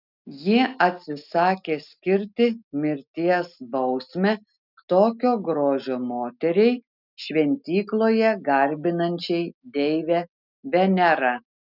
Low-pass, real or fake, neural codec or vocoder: 5.4 kHz; real; none